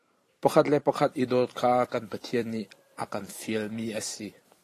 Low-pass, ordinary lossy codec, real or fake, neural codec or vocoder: 14.4 kHz; AAC, 48 kbps; fake; vocoder, 44.1 kHz, 128 mel bands every 512 samples, BigVGAN v2